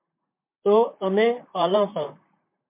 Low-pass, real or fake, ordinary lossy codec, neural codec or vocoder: 3.6 kHz; fake; MP3, 32 kbps; vocoder, 44.1 kHz, 128 mel bands, Pupu-Vocoder